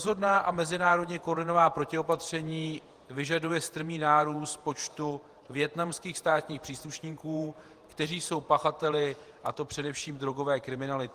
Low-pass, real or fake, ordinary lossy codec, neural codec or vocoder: 14.4 kHz; fake; Opus, 24 kbps; vocoder, 48 kHz, 128 mel bands, Vocos